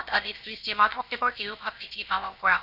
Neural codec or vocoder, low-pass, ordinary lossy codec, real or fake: codec, 16 kHz, about 1 kbps, DyCAST, with the encoder's durations; 5.4 kHz; none; fake